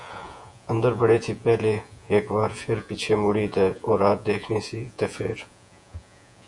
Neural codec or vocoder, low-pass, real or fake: vocoder, 48 kHz, 128 mel bands, Vocos; 10.8 kHz; fake